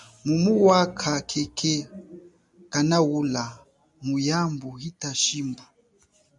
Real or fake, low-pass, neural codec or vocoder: real; 10.8 kHz; none